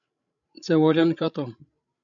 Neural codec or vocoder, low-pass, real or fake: codec, 16 kHz, 16 kbps, FreqCodec, larger model; 7.2 kHz; fake